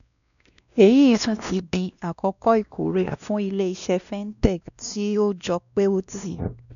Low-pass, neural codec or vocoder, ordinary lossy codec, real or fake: 7.2 kHz; codec, 16 kHz, 1 kbps, X-Codec, WavLM features, trained on Multilingual LibriSpeech; none; fake